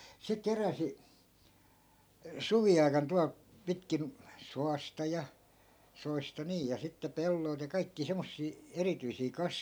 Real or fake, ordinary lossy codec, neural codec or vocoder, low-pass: real; none; none; none